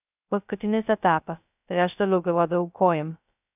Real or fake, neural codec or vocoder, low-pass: fake; codec, 16 kHz, 0.2 kbps, FocalCodec; 3.6 kHz